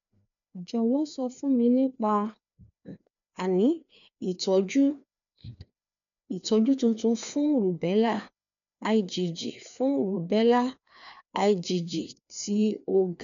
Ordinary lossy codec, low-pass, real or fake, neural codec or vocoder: none; 7.2 kHz; fake; codec, 16 kHz, 2 kbps, FreqCodec, larger model